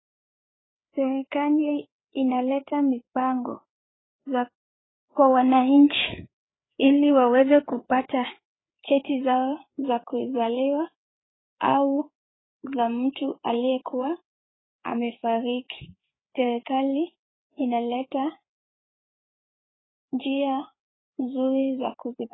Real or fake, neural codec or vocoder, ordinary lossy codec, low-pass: fake; codec, 16 kHz, 4 kbps, FreqCodec, larger model; AAC, 16 kbps; 7.2 kHz